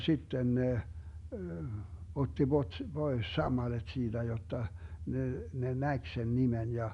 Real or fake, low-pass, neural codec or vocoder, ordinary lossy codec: real; 10.8 kHz; none; none